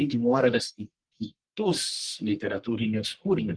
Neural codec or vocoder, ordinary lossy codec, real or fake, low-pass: codec, 44.1 kHz, 1.7 kbps, Pupu-Codec; Opus, 24 kbps; fake; 9.9 kHz